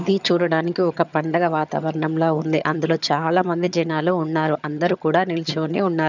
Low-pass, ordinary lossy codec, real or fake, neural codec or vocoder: 7.2 kHz; none; fake; vocoder, 22.05 kHz, 80 mel bands, HiFi-GAN